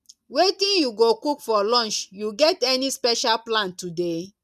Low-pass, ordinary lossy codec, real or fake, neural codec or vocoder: 14.4 kHz; none; real; none